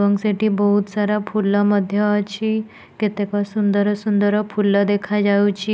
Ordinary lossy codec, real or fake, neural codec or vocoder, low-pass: none; real; none; none